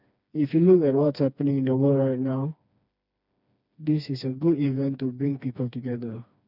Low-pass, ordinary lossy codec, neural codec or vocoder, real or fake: 5.4 kHz; none; codec, 16 kHz, 2 kbps, FreqCodec, smaller model; fake